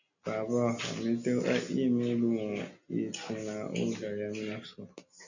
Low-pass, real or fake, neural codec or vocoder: 7.2 kHz; real; none